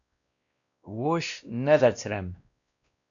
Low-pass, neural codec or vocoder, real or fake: 7.2 kHz; codec, 16 kHz, 1 kbps, X-Codec, WavLM features, trained on Multilingual LibriSpeech; fake